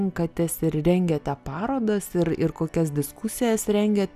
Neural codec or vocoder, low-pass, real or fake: none; 14.4 kHz; real